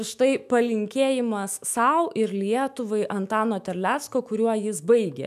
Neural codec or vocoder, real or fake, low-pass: autoencoder, 48 kHz, 128 numbers a frame, DAC-VAE, trained on Japanese speech; fake; 14.4 kHz